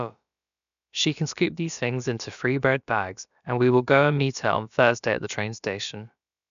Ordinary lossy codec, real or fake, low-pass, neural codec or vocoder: none; fake; 7.2 kHz; codec, 16 kHz, about 1 kbps, DyCAST, with the encoder's durations